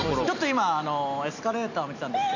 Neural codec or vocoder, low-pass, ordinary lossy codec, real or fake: none; 7.2 kHz; AAC, 48 kbps; real